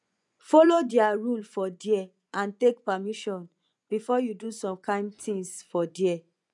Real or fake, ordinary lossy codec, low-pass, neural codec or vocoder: fake; none; 10.8 kHz; vocoder, 24 kHz, 100 mel bands, Vocos